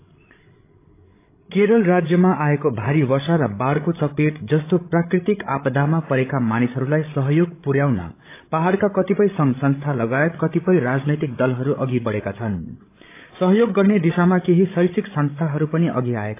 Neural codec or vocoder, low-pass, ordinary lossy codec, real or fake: codec, 16 kHz, 16 kbps, FreqCodec, larger model; 3.6 kHz; AAC, 24 kbps; fake